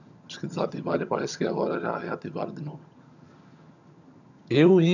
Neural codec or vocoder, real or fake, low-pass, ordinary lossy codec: vocoder, 22.05 kHz, 80 mel bands, HiFi-GAN; fake; 7.2 kHz; none